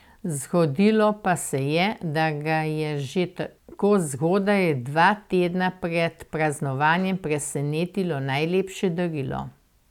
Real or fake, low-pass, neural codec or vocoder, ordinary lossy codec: real; 19.8 kHz; none; none